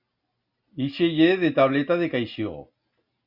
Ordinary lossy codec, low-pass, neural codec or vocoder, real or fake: Opus, 64 kbps; 5.4 kHz; none; real